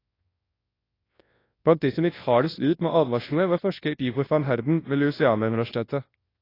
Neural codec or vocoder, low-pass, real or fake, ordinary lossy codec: codec, 24 kHz, 0.9 kbps, WavTokenizer, large speech release; 5.4 kHz; fake; AAC, 24 kbps